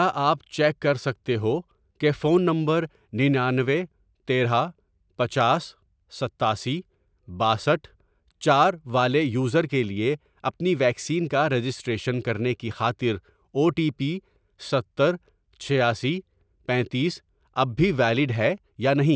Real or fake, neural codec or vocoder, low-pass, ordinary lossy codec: real; none; none; none